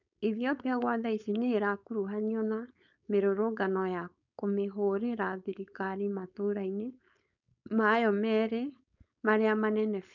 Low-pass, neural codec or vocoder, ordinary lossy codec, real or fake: 7.2 kHz; codec, 16 kHz, 4.8 kbps, FACodec; none; fake